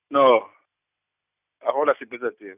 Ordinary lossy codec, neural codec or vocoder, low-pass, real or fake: none; none; 3.6 kHz; real